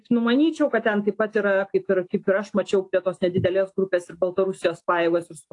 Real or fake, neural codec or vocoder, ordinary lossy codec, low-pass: real; none; AAC, 48 kbps; 10.8 kHz